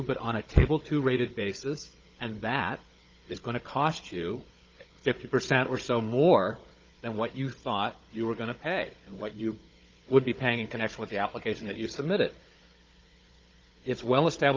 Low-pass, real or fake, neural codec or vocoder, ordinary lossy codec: 7.2 kHz; fake; codec, 16 kHz, 16 kbps, FunCodec, trained on Chinese and English, 50 frames a second; Opus, 24 kbps